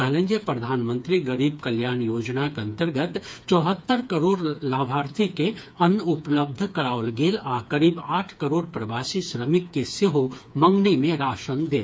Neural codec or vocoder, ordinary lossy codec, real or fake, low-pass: codec, 16 kHz, 4 kbps, FreqCodec, smaller model; none; fake; none